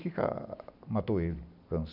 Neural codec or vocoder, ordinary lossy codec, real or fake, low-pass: none; none; real; 5.4 kHz